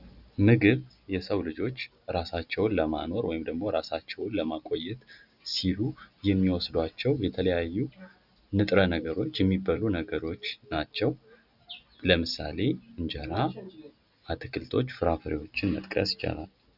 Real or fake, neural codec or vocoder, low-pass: real; none; 5.4 kHz